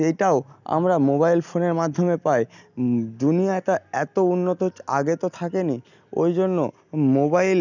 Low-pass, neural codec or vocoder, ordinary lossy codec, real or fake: 7.2 kHz; none; none; real